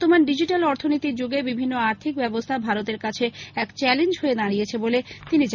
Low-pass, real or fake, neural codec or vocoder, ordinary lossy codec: 7.2 kHz; real; none; none